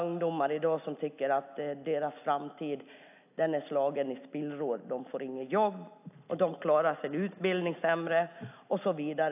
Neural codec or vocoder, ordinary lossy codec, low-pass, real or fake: none; AAC, 32 kbps; 3.6 kHz; real